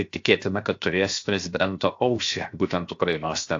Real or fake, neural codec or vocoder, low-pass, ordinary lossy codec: fake; codec, 16 kHz, 0.7 kbps, FocalCodec; 7.2 kHz; AAC, 48 kbps